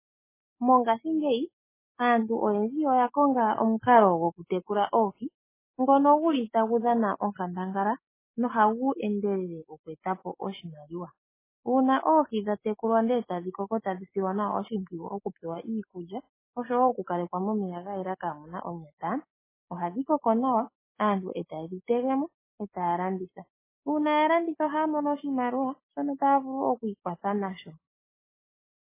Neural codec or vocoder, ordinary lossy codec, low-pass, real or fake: none; MP3, 16 kbps; 3.6 kHz; real